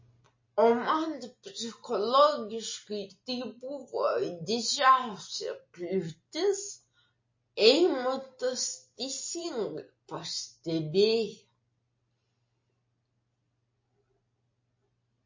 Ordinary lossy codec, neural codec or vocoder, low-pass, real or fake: MP3, 32 kbps; none; 7.2 kHz; real